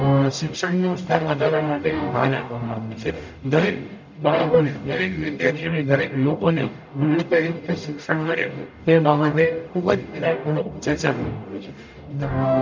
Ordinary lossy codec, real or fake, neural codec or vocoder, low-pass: MP3, 64 kbps; fake; codec, 44.1 kHz, 0.9 kbps, DAC; 7.2 kHz